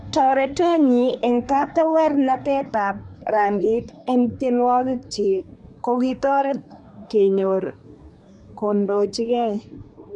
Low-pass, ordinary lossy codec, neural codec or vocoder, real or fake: 10.8 kHz; none; codec, 24 kHz, 1 kbps, SNAC; fake